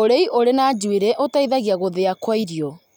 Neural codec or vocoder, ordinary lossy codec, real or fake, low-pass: none; none; real; none